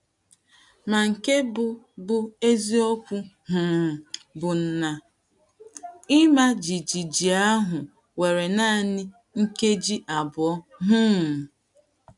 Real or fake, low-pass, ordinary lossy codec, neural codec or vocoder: real; 10.8 kHz; none; none